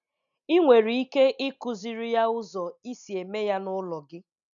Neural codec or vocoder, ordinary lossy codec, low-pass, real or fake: none; none; 7.2 kHz; real